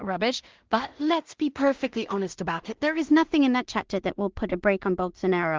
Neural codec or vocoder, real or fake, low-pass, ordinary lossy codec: codec, 16 kHz in and 24 kHz out, 0.4 kbps, LongCat-Audio-Codec, two codebook decoder; fake; 7.2 kHz; Opus, 16 kbps